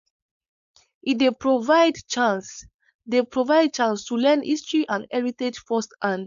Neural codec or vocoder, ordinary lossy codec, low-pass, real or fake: codec, 16 kHz, 4.8 kbps, FACodec; none; 7.2 kHz; fake